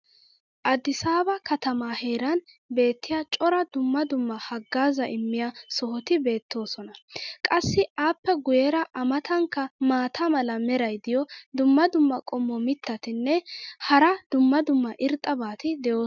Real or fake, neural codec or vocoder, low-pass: real; none; 7.2 kHz